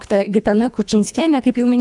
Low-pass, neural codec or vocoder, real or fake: 10.8 kHz; codec, 24 kHz, 1.5 kbps, HILCodec; fake